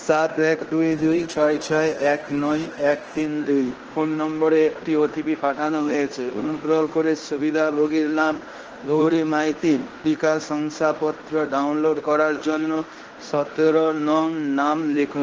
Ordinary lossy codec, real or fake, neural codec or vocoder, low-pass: Opus, 16 kbps; fake; codec, 16 kHz in and 24 kHz out, 0.9 kbps, LongCat-Audio-Codec, fine tuned four codebook decoder; 7.2 kHz